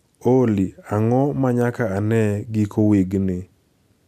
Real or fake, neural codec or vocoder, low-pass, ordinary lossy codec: real; none; 14.4 kHz; none